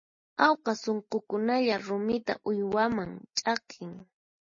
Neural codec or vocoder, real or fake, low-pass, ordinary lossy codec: none; real; 7.2 kHz; MP3, 32 kbps